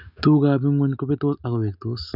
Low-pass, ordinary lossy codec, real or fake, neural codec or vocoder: 5.4 kHz; none; real; none